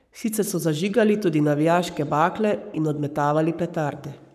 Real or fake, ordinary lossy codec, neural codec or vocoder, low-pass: fake; none; codec, 44.1 kHz, 7.8 kbps, Pupu-Codec; 14.4 kHz